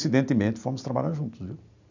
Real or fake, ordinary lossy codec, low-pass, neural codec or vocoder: real; none; 7.2 kHz; none